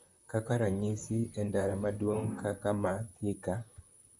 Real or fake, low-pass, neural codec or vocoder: fake; 10.8 kHz; vocoder, 44.1 kHz, 128 mel bands, Pupu-Vocoder